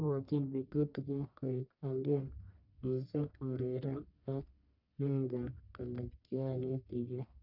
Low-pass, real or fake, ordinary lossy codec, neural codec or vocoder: 5.4 kHz; fake; none; codec, 44.1 kHz, 1.7 kbps, Pupu-Codec